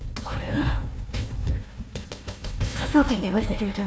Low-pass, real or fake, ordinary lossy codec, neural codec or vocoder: none; fake; none; codec, 16 kHz, 1 kbps, FunCodec, trained on Chinese and English, 50 frames a second